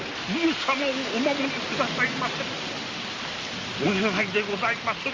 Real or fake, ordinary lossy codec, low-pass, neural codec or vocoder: real; Opus, 32 kbps; 7.2 kHz; none